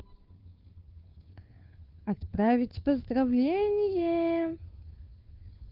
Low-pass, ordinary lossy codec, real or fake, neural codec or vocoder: 5.4 kHz; Opus, 24 kbps; fake; codec, 16 kHz, 2 kbps, FunCodec, trained on Chinese and English, 25 frames a second